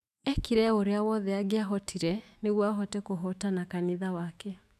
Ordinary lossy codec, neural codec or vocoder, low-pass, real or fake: none; autoencoder, 48 kHz, 128 numbers a frame, DAC-VAE, trained on Japanese speech; 14.4 kHz; fake